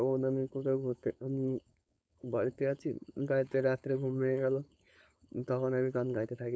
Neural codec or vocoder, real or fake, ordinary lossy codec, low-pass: codec, 16 kHz, 4.8 kbps, FACodec; fake; none; none